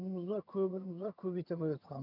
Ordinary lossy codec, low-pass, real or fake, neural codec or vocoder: none; 5.4 kHz; fake; vocoder, 22.05 kHz, 80 mel bands, HiFi-GAN